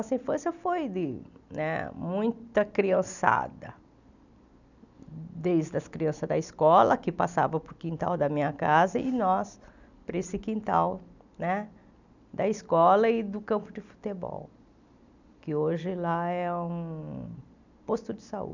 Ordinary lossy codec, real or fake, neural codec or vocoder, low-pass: none; real; none; 7.2 kHz